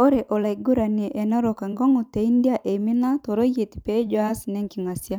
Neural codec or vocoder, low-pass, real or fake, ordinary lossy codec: vocoder, 44.1 kHz, 128 mel bands every 512 samples, BigVGAN v2; 19.8 kHz; fake; none